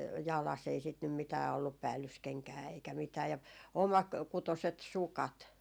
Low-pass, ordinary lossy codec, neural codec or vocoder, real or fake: none; none; none; real